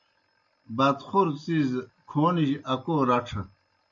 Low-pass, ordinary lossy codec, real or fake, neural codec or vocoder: 7.2 kHz; MP3, 48 kbps; real; none